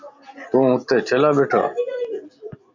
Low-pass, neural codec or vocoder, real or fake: 7.2 kHz; none; real